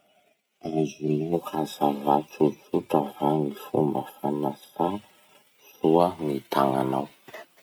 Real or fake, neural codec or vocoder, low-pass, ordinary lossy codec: real; none; none; none